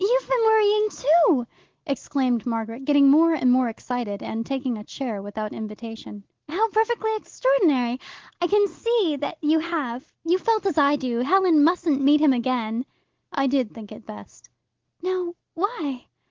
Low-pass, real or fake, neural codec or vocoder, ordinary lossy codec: 7.2 kHz; real; none; Opus, 16 kbps